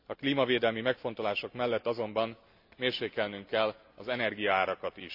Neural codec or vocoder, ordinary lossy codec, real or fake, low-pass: none; AAC, 48 kbps; real; 5.4 kHz